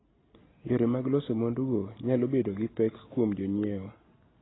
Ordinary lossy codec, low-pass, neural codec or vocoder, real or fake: AAC, 16 kbps; 7.2 kHz; none; real